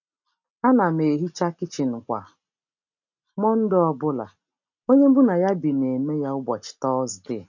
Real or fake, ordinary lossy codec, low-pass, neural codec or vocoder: real; none; 7.2 kHz; none